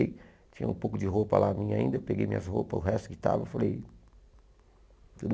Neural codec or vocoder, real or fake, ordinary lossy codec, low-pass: none; real; none; none